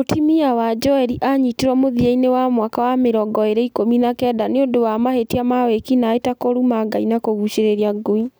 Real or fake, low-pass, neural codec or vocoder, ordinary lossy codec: real; none; none; none